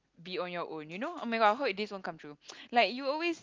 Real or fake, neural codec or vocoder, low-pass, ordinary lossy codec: real; none; 7.2 kHz; Opus, 24 kbps